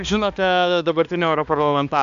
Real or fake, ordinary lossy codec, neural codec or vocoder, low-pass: fake; AAC, 96 kbps; codec, 16 kHz, 2 kbps, X-Codec, HuBERT features, trained on balanced general audio; 7.2 kHz